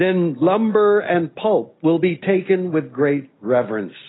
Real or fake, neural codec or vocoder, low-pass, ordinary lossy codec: real; none; 7.2 kHz; AAC, 16 kbps